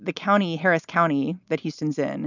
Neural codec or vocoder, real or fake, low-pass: none; real; 7.2 kHz